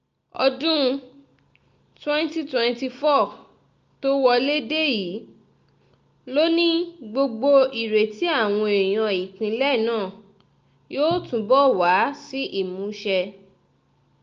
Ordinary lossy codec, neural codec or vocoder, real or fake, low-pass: Opus, 24 kbps; none; real; 7.2 kHz